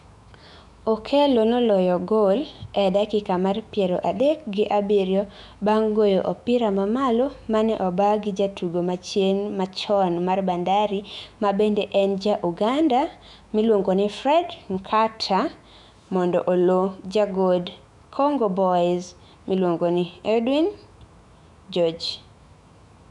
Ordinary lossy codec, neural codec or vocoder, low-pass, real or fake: none; autoencoder, 48 kHz, 128 numbers a frame, DAC-VAE, trained on Japanese speech; 10.8 kHz; fake